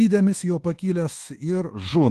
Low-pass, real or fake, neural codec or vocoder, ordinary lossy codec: 10.8 kHz; fake; codec, 24 kHz, 1.2 kbps, DualCodec; Opus, 16 kbps